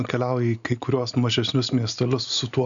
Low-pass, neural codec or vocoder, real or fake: 7.2 kHz; none; real